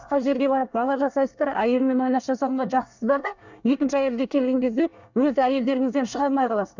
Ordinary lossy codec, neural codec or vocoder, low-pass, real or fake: none; codec, 24 kHz, 1 kbps, SNAC; 7.2 kHz; fake